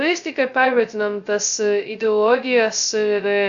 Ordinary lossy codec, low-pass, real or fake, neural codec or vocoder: MP3, 96 kbps; 7.2 kHz; fake; codec, 16 kHz, 0.2 kbps, FocalCodec